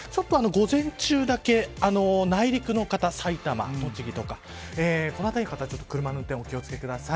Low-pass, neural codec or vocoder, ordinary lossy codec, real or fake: none; none; none; real